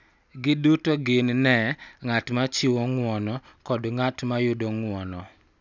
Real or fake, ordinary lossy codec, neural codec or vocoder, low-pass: real; none; none; 7.2 kHz